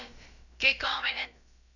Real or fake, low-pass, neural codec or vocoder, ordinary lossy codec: fake; 7.2 kHz; codec, 16 kHz, about 1 kbps, DyCAST, with the encoder's durations; none